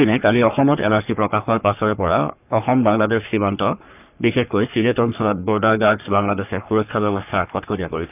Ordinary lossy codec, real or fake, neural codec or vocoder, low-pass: none; fake; codec, 44.1 kHz, 3.4 kbps, Pupu-Codec; 3.6 kHz